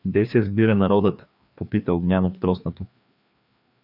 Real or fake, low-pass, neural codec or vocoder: fake; 5.4 kHz; codec, 16 kHz, 2 kbps, FreqCodec, larger model